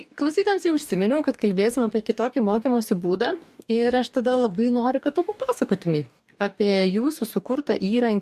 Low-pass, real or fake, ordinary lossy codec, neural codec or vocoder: 14.4 kHz; fake; MP3, 96 kbps; codec, 44.1 kHz, 2.6 kbps, DAC